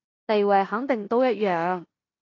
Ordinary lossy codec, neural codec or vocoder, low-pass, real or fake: AAC, 32 kbps; codec, 16 kHz in and 24 kHz out, 0.9 kbps, LongCat-Audio-Codec, four codebook decoder; 7.2 kHz; fake